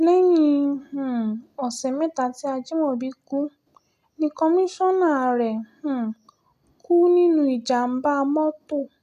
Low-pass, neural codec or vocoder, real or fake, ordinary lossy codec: 14.4 kHz; none; real; none